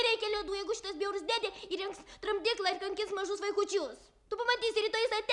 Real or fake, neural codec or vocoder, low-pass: real; none; 10.8 kHz